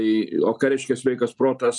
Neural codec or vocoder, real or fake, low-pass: none; real; 10.8 kHz